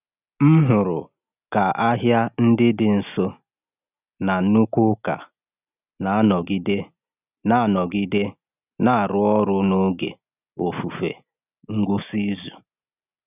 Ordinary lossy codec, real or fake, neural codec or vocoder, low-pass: none; real; none; 3.6 kHz